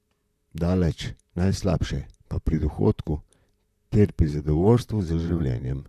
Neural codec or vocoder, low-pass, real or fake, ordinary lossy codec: vocoder, 44.1 kHz, 128 mel bands, Pupu-Vocoder; 14.4 kHz; fake; none